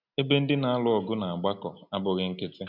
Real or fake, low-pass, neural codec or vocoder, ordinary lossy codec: real; 5.4 kHz; none; none